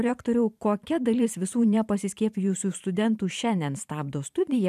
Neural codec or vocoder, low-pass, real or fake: vocoder, 44.1 kHz, 128 mel bands every 512 samples, BigVGAN v2; 14.4 kHz; fake